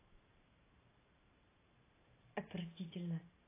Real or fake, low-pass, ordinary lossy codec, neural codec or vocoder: fake; 3.6 kHz; AAC, 16 kbps; vocoder, 44.1 kHz, 128 mel bands every 512 samples, BigVGAN v2